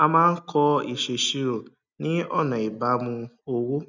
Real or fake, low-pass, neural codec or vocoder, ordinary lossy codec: real; 7.2 kHz; none; none